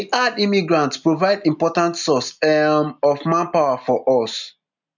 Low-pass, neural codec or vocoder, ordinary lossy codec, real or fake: 7.2 kHz; none; none; real